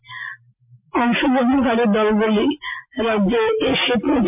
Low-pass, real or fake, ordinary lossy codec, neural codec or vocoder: 3.6 kHz; real; MP3, 32 kbps; none